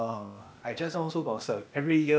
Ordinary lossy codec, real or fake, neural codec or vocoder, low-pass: none; fake; codec, 16 kHz, 0.8 kbps, ZipCodec; none